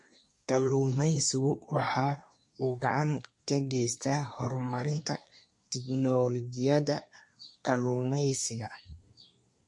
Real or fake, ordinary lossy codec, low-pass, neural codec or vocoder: fake; MP3, 48 kbps; 10.8 kHz; codec, 24 kHz, 1 kbps, SNAC